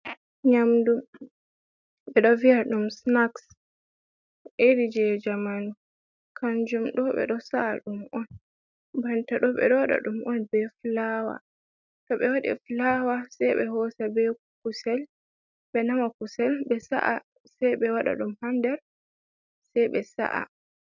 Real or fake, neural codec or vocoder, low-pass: real; none; 7.2 kHz